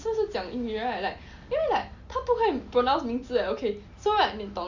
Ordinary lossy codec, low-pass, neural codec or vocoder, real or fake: Opus, 64 kbps; 7.2 kHz; none; real